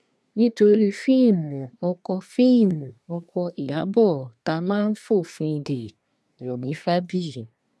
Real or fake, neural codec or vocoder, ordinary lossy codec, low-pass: fake; codec, 24 kHz, 1 kbps, SNAC; none; none